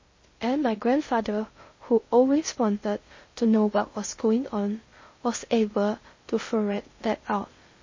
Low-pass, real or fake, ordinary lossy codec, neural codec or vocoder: 7.2 kHz; fake; MP3, 32 kbps; codec, 16 kHz in and 24 kHz out, 0.6 kbps, FocalCodec, streaming, 2048 codes